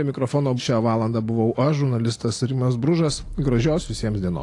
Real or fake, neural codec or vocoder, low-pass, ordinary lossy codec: real; none; 10.8 kHz; AAC, 48 kbps